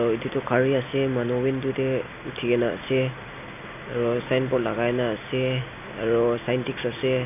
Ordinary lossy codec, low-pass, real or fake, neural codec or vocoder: none; 3.6 kHz; real; none